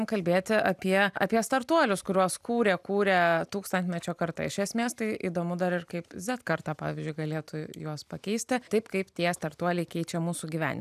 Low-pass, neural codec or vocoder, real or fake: 14.4 kHz; none; real